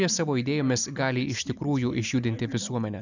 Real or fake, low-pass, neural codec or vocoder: real; 7.2 kHz; none